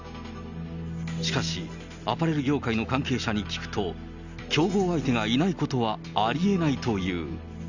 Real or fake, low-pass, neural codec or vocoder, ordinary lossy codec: real; 7.2 kHz; none; none